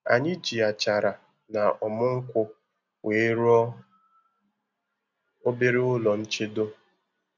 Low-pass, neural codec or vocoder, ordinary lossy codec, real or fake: 7.2 kHz; none; none; real